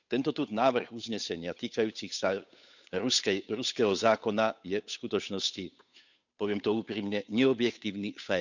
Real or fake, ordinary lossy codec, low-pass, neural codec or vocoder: fake; none; 7.2 kHz; codec, 16 kHz, 8 kbps, FunCodec, trained on Chinese and English, 25 frames a second